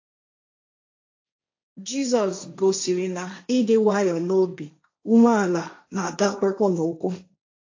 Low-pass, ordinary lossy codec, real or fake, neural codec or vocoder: 7.2 kHz; AAC, 48 kbps; fake; codec, 16 kHz, 1.1 kbps, Voila-Tokenizer